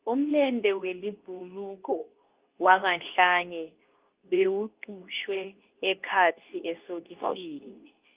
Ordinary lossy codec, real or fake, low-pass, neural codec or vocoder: Opus, 32 kbps; fake; 3.6 kHz; codec, 24 kHz, 0.9 kbps, WavTokenizer, medium speech release version 2